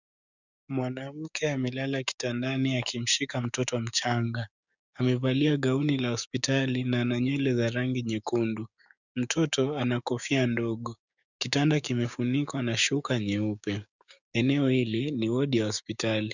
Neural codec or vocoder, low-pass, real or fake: codec, 16 kHz, 6 kbps, DAC; 7.2 kHz; fake